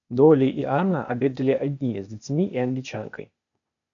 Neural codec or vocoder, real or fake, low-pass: codec, 16 kHz, 0.8 kbps, ZipCodec; fake; 7.2 kHz